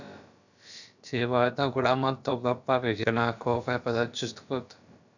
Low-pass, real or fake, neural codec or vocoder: 7.2 kHz; fake; codec, 16 kHz, about 1 kbps, DyCAST, with the encoder's durations